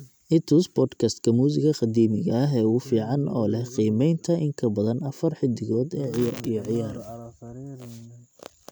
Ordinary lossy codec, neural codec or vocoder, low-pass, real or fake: none; none; none; real